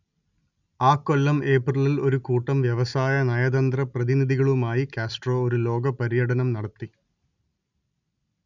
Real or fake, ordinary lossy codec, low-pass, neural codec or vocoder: real; none; 7.2 kHz; none